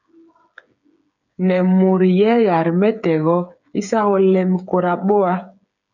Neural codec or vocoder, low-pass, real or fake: codec, 16 kHz, 8 kbps, FreqCodec, smaller model; 7.2 kHz; fake